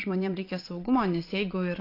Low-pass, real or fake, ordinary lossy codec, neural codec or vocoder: 5.4 kHz; fake; AAC, 32 kbps; vocoder, 22.05 kHz, 80 mel bands, Vocos